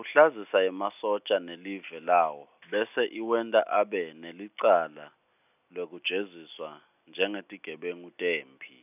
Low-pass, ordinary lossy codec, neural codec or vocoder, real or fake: 3.6 kHz; none; none; real